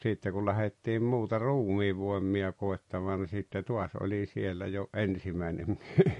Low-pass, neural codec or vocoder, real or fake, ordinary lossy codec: 14.4 kHz; none; real; MP3, 48 kbps